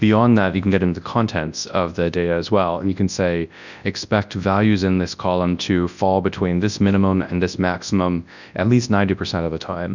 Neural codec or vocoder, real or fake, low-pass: codec, 24 kHz, 0.9 kbps, WavTokenizer, large speech release; fake; 7.2 kHz